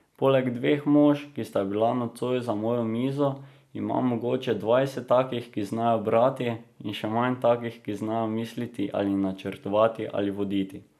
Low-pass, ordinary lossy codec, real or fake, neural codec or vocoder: 14.4 kHz; none; real; none